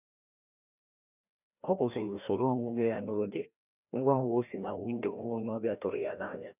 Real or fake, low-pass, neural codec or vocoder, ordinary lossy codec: fake; 3.6 kHz; codec, 16 kHz, 1 kbps, FreqCodec, larger model; none